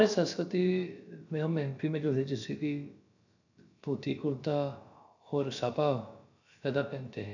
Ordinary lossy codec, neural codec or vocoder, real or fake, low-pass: none; codec, 16 kHz, about 1 kbps, DyCAST, with the encoder's durations; fake; 7.2 kHz